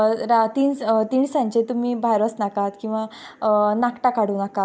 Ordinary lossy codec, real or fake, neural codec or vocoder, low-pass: none; real; none; none